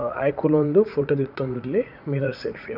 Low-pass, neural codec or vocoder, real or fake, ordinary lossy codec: 5.4 kHz; vocoder, 44.1 kHz, 80 mel bands, Vocos; fake; none